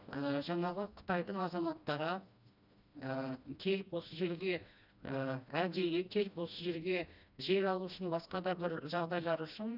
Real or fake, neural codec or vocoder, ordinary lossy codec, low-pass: fake; codec, 16 kHz, 1 kbps, FreqCodec, smaller model; none; 5.4 kHz